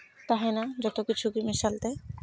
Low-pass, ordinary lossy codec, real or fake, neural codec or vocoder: none; none; real; none